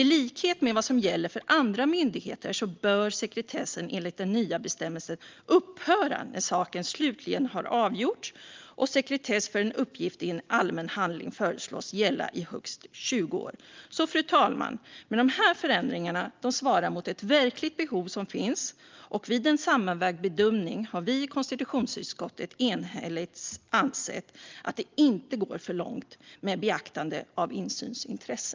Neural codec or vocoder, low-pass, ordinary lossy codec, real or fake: none; 7.2 kHz; Opus, 24 kbps; real